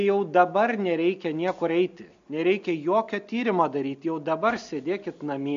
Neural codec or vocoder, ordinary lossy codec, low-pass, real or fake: none; MP3, 48 kbps; 7.2 kHz; real